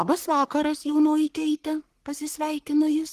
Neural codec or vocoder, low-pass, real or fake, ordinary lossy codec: codec, 44.1 kHz, 3.4 kbps, Pupu-Codec; 14.4 kHz; fake; Opus, 16 kbps